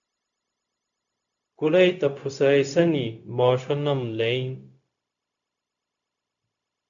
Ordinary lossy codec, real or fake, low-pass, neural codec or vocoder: AAC, 64 kbps; fake; 7.2 kHz; codec, 16 kHz, 0.4 kbps, LongCat-Audio-Codec